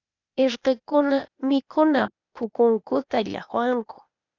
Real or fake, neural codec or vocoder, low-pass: fake; codec, 16 kHz, 0.8 kbps, ZipCodec; 7.2 kHz